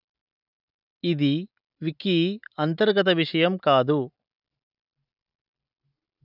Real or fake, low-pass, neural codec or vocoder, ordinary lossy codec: real; 5.4 kHz; none; none